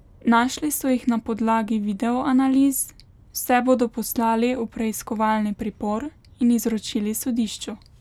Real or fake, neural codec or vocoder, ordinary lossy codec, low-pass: real; none; none; 19.8 kHz